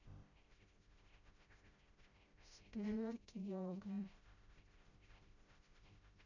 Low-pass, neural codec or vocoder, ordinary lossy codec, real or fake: 7.2 kHz; codec, 16 kHz, 0.5 kbps, FreqCodec, smaller model; none; fake